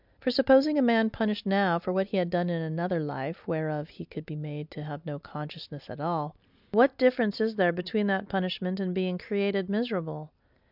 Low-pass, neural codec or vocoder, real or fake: 5.4 kHz; none; real